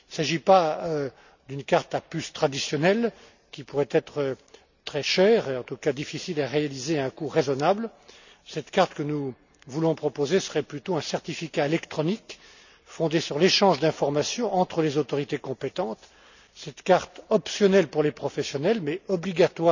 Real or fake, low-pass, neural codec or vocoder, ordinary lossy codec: real; 7.2 kHz; none; none